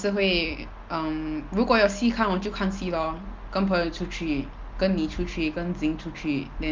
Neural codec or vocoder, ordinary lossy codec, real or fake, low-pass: none; Opus, 32 kbps; real; 7.2 kHz